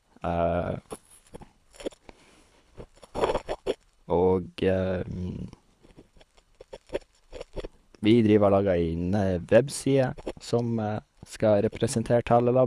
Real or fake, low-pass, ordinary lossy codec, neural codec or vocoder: fake; none; none; codec, 24 kHz, 6 kbps, HILCodec